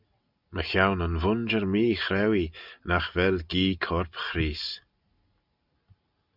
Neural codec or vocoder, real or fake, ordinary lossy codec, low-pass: none; real; Opus, 64 kbps; 5.4 kHz